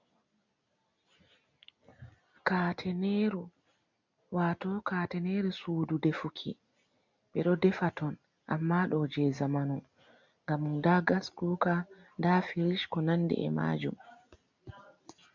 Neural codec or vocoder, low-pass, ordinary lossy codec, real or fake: none; 7.2 kHz; MP3, 64 kbps; real